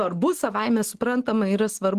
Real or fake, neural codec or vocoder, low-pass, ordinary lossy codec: real; none; 14.4 kHz; Opus, 16 kbps